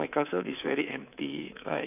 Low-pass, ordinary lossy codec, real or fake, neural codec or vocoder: 3.6 kHz; none; fake; codec, 24 kHz, 3.1 kbps, DualCodec